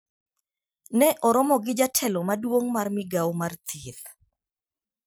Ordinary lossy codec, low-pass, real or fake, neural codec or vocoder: none; none; real; none